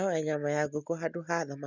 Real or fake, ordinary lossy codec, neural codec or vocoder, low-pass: real; none; none; 7.2 kHz